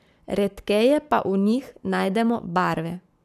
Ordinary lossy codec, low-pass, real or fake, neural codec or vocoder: none; 14.4 kHz; fake; codec, 44.1 kHz, 7.8 kbps, Pupu-Codec